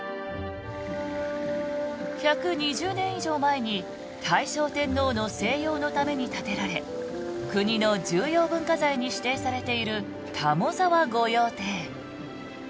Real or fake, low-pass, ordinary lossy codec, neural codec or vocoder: real; none; none; none